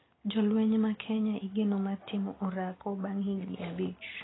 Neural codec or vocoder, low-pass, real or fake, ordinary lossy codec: none; 7.2 kHz; real; AAC, 16 kbps